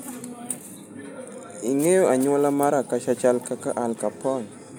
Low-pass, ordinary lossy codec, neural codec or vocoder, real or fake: none; none; none; real